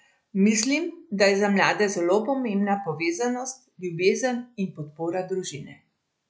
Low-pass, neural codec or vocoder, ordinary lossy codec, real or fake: none; none; none; real